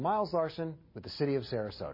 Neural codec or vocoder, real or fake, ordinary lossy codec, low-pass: none; real; MP3, 24 kbps; 5.4 kHz